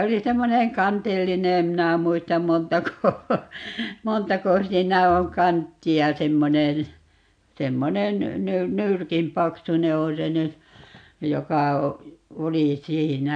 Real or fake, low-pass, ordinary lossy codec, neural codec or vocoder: real; 9.9 kHz; AAC, 64 kbps; none